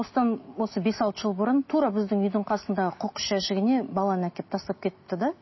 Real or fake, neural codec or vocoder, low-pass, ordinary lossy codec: real; none; 7.2 kHz; MP3, 24 kbps